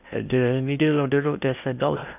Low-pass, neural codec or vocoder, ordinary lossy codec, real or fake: 3.6 kHz; codec, 16 kHz in and 24 kHz out, 0.8 kbps, FocalCodec, streaming, 65536 codes; none; fake